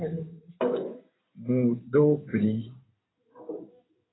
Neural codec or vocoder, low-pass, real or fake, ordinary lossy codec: codec, 16 kHz in and 24 kHz out, 2.2 kbps, FireRedTTS-2 codec; 7.2 kHz; fake; AAC, 16 kbps